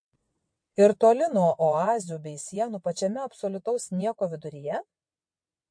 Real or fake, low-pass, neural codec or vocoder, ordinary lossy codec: fake; 9.9 kHz; vocoder, 22.05 kHz, 80 mel bands, WaveNeXt; MP3, 48 kbps